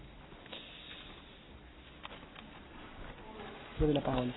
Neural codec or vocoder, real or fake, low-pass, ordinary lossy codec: none; real; 7.2 kHz; AAC, 16 kbps